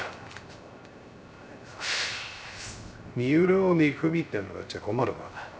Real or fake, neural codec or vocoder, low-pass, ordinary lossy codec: fake; codec, 16 kHz, 0.3 kbps, FocalCodec; none; none